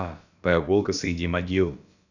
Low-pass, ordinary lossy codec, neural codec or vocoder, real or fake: 7.2 kHz; none; codec, 16 kHz, about 1 kbps, DyCAST, with the encoder's durations; fake